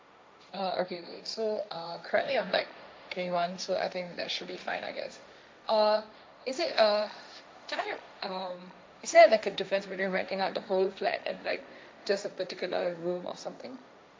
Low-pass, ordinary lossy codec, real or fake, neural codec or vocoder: none; none; fake; codec, 16 kHz, 1.1 kbps, Voila-Tokenizer